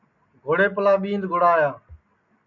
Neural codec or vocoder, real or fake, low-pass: none; real; 7.2 kHz